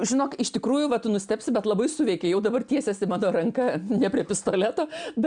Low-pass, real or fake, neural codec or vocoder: 9.9 kHz; real; none